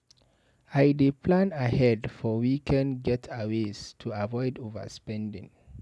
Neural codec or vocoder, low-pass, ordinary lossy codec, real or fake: none; none; none; real